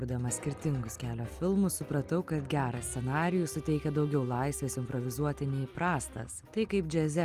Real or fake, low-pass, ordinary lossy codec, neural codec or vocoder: real; 14.4 kHz; Opus, 32 kbps; none